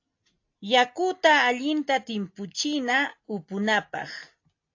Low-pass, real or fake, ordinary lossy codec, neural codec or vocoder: 7.2 kHz; real; AAC, 48 kbps; none